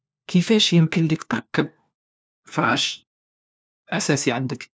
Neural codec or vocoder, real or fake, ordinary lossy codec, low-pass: codec, 16 kHz, 1 kbps, FunCodec, trained on LibriTTS, 50 frames a second; fake; none; none